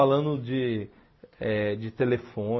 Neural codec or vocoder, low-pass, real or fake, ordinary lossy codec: none; 7.2 kHz; real; MP3, 24 kbps